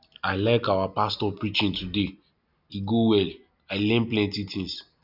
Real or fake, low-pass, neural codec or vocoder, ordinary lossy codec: real; 5.4 kHz; none; none